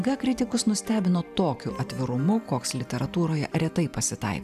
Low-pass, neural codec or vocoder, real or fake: 14.4 kHz; vocoder, 44.1 kHz, 128 mel bands every 512 samples, BigVGAN v2; fake